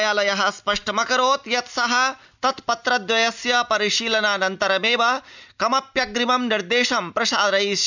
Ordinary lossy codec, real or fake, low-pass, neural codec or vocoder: none; real; 7.2 kHz; none